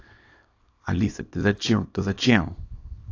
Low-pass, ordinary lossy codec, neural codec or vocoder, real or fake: 7.2 kHz; AAC, 48 kbps; codec, 24 kHz, 0.9 kbps, WavTokenizer, small release; fake